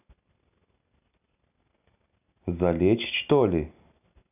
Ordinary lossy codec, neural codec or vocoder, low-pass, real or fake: none; none; 3.6 kHz; real